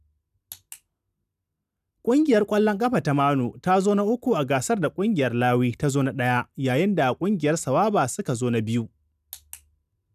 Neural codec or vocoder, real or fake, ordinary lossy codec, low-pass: none; real; none; 14.4 kHz